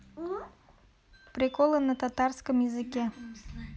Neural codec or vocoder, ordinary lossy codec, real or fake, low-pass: none; none; real; none